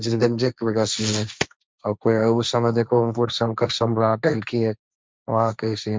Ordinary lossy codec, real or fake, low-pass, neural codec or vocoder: none; fake; none; codec, 16 kHz, 1.1 kbps, Voila-Tokenizer